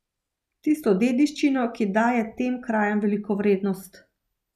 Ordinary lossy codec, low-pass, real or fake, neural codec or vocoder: none; 14.4 kHz; real; none